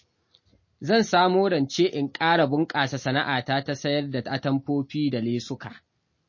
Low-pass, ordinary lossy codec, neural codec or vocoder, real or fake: 7.2 kHz; MP3, 32 kbps; none; real